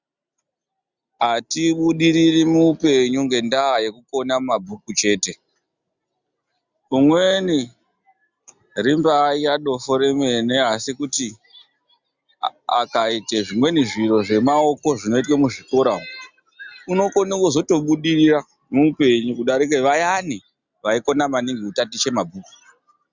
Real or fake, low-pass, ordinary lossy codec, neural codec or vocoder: real; 7.2 kHz; Opus, 64 kbps; none